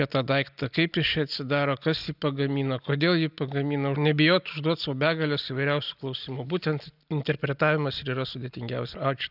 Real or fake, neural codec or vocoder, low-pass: real; none; 5.4 kHz